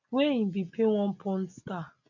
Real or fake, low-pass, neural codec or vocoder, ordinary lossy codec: real; 7.2 kHz; none; none